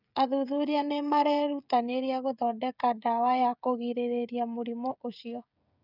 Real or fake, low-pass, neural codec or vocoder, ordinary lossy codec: fake; 5.4 kHz; codec, 16 kHz, 16 kbps, FreqCodec, smaller model; none